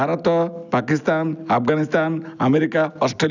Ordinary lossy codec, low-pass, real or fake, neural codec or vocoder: none; 7.2 kHz; real; none